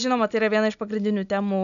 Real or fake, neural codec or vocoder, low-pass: real; none; 7.2 kHz